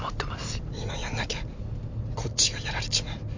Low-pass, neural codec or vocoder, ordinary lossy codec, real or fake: 7.2 kHz; none; MP3, 48 kbps; real